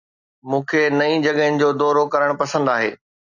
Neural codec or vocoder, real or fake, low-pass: none; real; 7.2 kHz